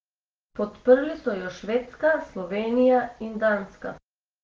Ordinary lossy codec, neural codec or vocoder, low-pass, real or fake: Opus, 16 kbps; none; 7.2 kHz; real